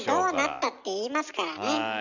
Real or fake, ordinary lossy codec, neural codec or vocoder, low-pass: real; none; none; 7.2 kHz